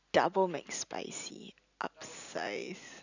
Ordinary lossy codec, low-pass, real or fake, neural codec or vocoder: none; 7.2 kHz; real; none